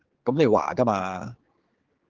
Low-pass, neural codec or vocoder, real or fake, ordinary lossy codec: 7.2 kHz; codec, 16 kHz, 4 kbps, X-Codec, HuBERT features, trained on general audio; fake; Opus, 16 kbps